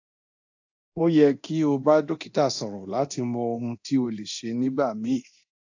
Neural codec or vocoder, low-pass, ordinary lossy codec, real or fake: codec, 24 kHz, 0.9 kbps, DualCodec; 7.2 kHz; AAC, 48 kbps; fake